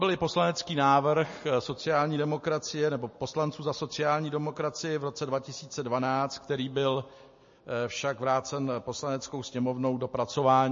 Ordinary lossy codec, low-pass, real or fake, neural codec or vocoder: MP3, 32 kbps; 7.2 kHz; real; none